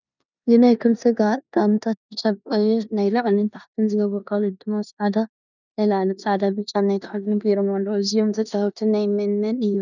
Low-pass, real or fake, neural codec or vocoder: 7.2 kHz; fake; codec, 16 kHz in and 24 kHz out, 0.9 kbps, LongCat-Audio-Codec, four codebook decoder